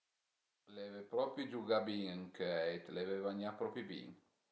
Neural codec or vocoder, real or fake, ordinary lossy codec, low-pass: none; real; none; none